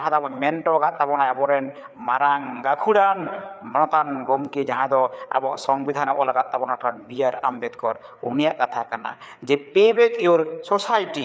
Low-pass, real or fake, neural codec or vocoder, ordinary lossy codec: none; fake; codec, 16 kHz, 4 kbps, FreqCodec, larger model; none